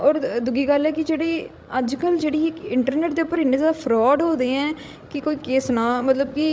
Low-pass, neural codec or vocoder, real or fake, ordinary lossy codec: none; codec, 16 kHz, 16 kbps, FreqCodec, larger model; fake; none